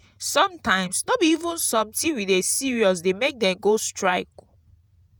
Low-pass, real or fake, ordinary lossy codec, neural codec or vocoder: none; fake; none; vocoder, 48 kHz, 128 mel bands, Vocos